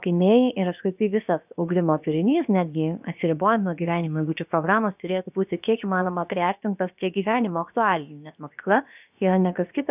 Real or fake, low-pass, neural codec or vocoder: fake; 3.6 kHz; codec, 16 kHz, about 1 kbps, DyCAST, with the encoder's durations